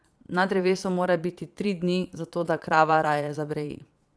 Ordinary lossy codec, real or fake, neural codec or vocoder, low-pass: none; fake; vocoder, 22.05 kHz, 80 mel bands, Vocos; none